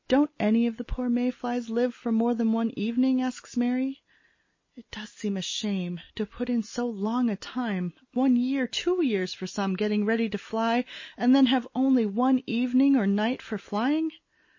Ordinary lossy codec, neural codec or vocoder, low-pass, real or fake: MP3, 32 kbps; none; 7.2 kHz; real